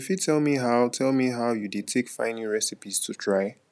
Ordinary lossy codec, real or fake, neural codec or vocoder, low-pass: none; real; none; none